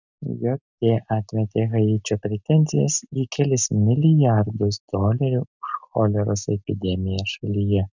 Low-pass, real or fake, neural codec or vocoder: 7.2 kHz; real; none